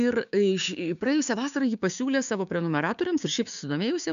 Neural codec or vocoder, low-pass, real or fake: codec, 16 kHz, 6 kbps, DAC; 7.2 kHz; fake